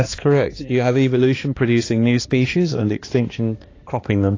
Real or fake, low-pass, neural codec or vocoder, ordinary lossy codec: fake; 7.2 kHz; codec, 16 kHz, 2 kbps, X-Codec, HuBERT features, trained on balanced general audio; AAC, 32 kbps